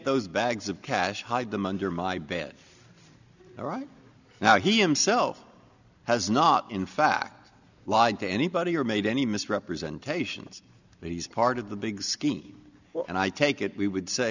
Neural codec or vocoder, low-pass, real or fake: none; 7.2 kHz; real